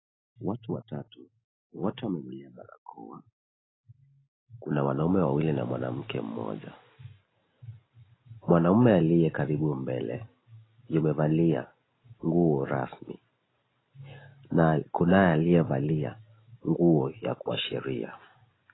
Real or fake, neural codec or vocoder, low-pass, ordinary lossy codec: real; none; 7.2 kHz; AAC, 16 kbps